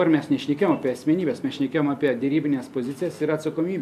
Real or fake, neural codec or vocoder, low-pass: real; none; 14.4 kHz